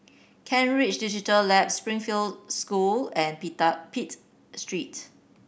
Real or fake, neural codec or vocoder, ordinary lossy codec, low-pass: real; none; none; none